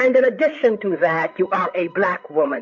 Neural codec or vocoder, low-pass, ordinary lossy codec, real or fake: codec, 16 kHz, 8 kbps, FreqCodec, larger model; 7.2 kHz; MP3, 48 kbps; fake